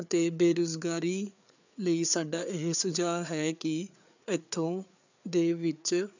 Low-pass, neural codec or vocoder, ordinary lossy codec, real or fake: 7.2 kHz; codec, 16 kHz, 4 kbps, FreqCodec, larger model; none; fake